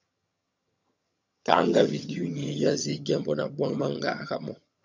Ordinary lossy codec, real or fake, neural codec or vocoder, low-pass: MP3, 64 kbps; fake; vocoder, 22.05 kHz, 80 mel bands, HiFi-GAN; 7.2 kHz